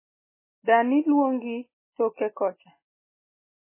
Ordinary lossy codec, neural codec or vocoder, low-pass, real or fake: MP3, 16 kbps; none; 3.6 kHz; real